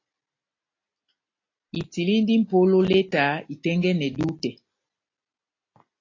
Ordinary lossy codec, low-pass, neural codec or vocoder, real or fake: AAC, 32 kbps; 7.2 kHz; none; real